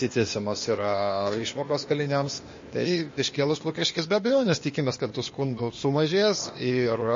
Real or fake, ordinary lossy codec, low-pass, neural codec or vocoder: fake; MP3, 32 kbps; 7.2 kHz; codec, 16 kHz, 0.8 kbps, ZipCodec